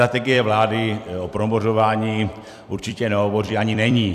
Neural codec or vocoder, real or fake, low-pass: vocoder, 44.1 kHz, 128 mel bands every 256 samples, BigVGAN v2; fake; 14.4 kHz